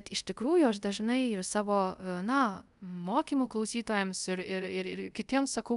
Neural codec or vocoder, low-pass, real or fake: codec, 24 kHz, 0.5 kbps, DualCodec; 10.8 kHz; fake